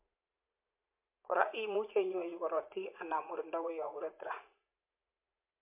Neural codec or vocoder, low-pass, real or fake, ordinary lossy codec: vocoder, 44.1 kHz, 80 mel bands, Vocos; 3.6 kHz; fake; MP3, 24 kbps